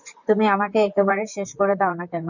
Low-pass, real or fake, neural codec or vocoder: 7.2 kHz; fake; vocoder, 44.1 kHz, 128 mel bands, Pupu-Vocoder